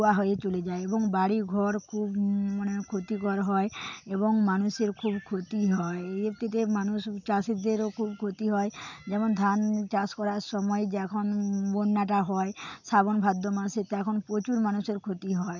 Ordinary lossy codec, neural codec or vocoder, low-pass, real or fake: none; none; 7.2 kHz; real